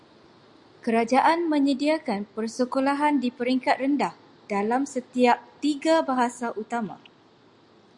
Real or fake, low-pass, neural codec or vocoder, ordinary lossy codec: real; 10.8 kHz; none; Opus, 64 kbps